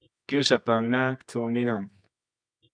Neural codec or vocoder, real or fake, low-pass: codec, 24 kHz, 0.9 kbps, WavTokenizer, medium music audio release; fake; 9.9 kHz